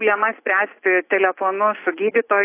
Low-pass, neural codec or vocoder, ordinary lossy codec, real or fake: 3.6 kHz; none; AAC, 24 kbps; real